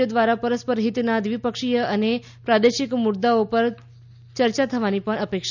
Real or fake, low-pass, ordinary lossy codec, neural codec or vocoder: real; 7.2 kHz; none; none